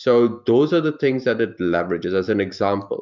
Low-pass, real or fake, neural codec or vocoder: 7.2 kHz; fake; vocoder, 44.1 kHz, 128 mel bands every 512 samples, BigVGAN v2